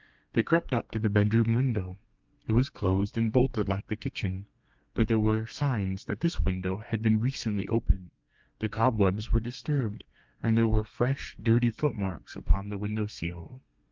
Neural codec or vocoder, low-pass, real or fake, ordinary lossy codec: codec, 32 kHz, 1.9 kbps, SNAC; 7.2 kHz; fake; Opus, 24 kbps